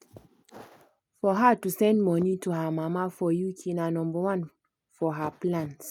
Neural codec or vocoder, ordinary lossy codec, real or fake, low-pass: none; none; real; 19.8 kHz